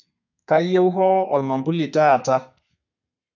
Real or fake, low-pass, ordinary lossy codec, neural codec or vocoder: fake; 7.2 kHz; none; codec, 32 kHz, 1.9 kbps, SNAC